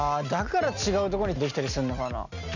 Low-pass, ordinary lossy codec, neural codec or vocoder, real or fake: 7.2 kHz; none; none; real